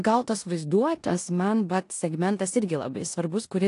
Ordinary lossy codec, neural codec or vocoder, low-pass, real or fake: AAC, 48 kbps; codec, 16 kHz in and 24 kHz out, 0.9 kbps, LongCat-Audio-Codec, four codebook decoder; 10.8 kHz; fake